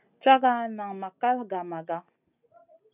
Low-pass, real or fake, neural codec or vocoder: 3.6 kHz; real; none